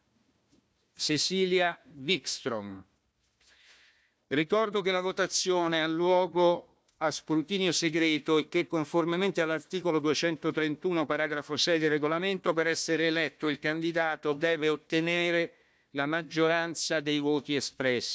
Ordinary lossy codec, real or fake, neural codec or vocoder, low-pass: none; fake; codec, 16 kHz, 1 kbps, FunCodec, trained on Chinese and English, 50 frames a second; none